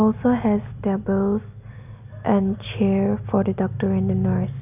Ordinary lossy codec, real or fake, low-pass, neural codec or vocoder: none; real; 3.6 kHz; none